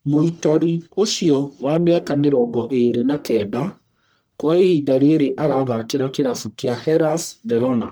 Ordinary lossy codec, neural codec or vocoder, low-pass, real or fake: none; codec, 44.1 kHz, 1.7 kbps, Pupu-Codec; none; fake